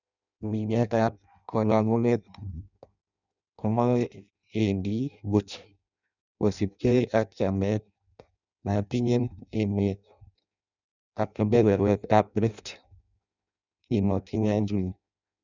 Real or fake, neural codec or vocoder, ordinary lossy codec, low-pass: fake; codec, 16 kHz in and 24 kHz out, 0.6 kbps, FireRedTTS-2 codec; none; 7.2 kHz